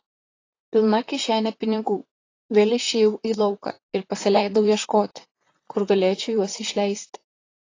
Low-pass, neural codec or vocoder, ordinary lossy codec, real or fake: 7.2 kHz; vocoder, 44.1 kHz, 128 mel bands, Pupu-Vocoder; AAC, 32 kbps; fake